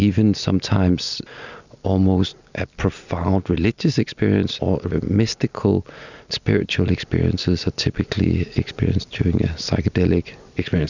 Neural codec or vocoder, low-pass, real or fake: none; 7.2 kHz; real